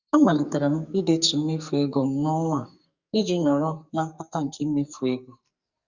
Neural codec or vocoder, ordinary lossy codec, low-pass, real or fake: codec, 44.1 kHz, 2.6 kbps, SNAC; Opus, 64 kbps; 7.2 kHz; fake